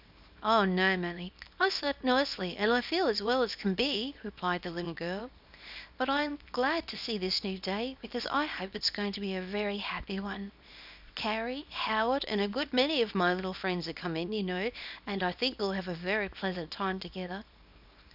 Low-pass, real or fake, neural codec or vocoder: 5.4 kHz; fake; codec, 24 kHz, 0.9 kbps, WavTokenizer, small release